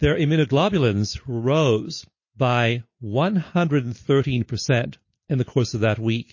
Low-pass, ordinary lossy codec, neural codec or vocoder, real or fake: 7.2 kHz; MP3, 32 kbps; none; real